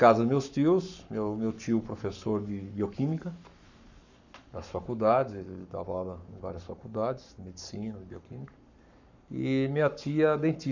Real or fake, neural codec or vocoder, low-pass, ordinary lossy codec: fake; codec, 44.1 kHz, 7.8 kbps, Pupu-Codec; 7.2 kHz; none